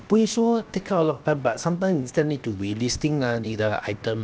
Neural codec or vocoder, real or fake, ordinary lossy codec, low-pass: codec, 16 kHz, 0.7 kbps, FocalCodec; fake; none; none